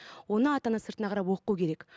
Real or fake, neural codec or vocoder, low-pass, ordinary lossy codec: real; none; none; none